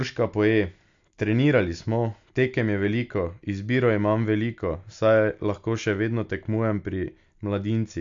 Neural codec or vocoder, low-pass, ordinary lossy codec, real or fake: none; 7.2 kHz; AAC, 64 kbps; real